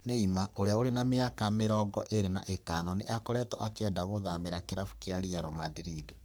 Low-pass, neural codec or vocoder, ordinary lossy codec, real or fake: none; codec, 44.1 kHz, 3.4 kbps, Pupu-Codec; none; fake